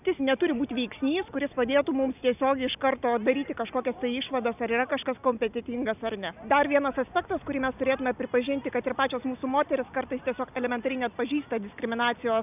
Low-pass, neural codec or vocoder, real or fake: 3.6 kHz; none; real